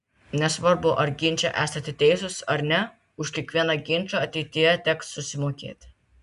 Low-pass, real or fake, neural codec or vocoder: 10.8 kHz; real; none